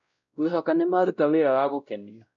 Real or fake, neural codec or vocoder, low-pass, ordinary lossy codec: fake; codec, 16 kHz, 0.5 kbps, X-Codec, WavLM features, trained on Multilingual LibriSpeech; 7.2 kHz; none